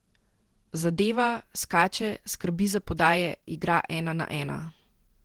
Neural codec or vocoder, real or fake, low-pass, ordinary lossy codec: vocoder, 48 kHz, 128 mel bands, Vocos; fake; 19.8 kHz; Opus, 16 kbps